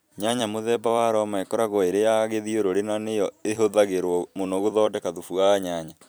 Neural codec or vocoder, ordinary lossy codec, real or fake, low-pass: vocoder, 44.1 kHz, 128 mel bands every 256 samples, BigVGAN v2; none; fake; none